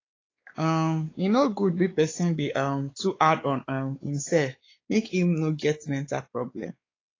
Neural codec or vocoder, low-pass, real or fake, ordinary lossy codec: codec, 16 kHz, 4 kbps, X-Codec, WavLM features, trained on Multilingual LibriSpeech; 7.2 kHz; fake; AAC, 32 kbps